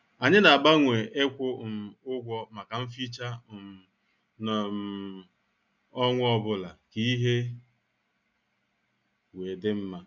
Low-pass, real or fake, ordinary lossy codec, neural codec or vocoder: 7.2 kHz; real; none; none